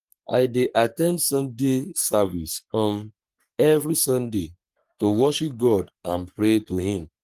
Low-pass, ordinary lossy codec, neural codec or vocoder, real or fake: 14.4 kHz; Opus, 32 kbps; codec, 44.1 kHz, 3.4 kbps, Pupu-Codec; fake